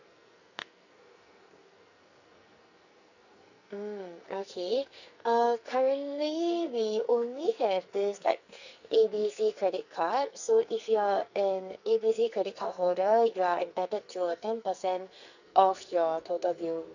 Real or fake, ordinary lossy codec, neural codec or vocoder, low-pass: fake; none; codec, 44.1 kHz, 2.6 kbps, SNAC; 7.2 kHz